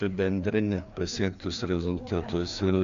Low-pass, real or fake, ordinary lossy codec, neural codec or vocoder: 7.2 kHz; fake; AAC, 64 kbps; codec, 16 kHz, 2 kbps, FreqCodec, larger model